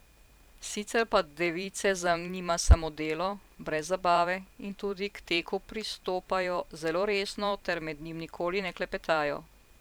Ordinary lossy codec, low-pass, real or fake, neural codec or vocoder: none; none; fake; vocoder, 44.1 kHz, 128 mel bands every 512 samples, BigVGAN v2